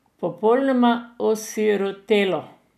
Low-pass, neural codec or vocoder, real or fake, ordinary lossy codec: 14.4 kHz; none; real; none